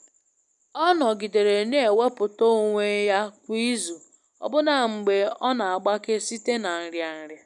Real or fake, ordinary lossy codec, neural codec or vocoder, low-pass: real; none; none; 10.8 kHz